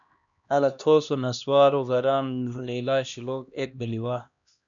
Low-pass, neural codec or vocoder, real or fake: 7.2 kHz; codec, 16 kHz, 1 kbps, X-Codec, HuBERT features, trained on LibriSpeech; fake